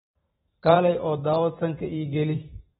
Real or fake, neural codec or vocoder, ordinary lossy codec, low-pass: fake; vocoder, 44.1 kHz, 128 mel bands every 512 samples, BigVGAN v2; AAC, 16 kbps; 19.8 kHz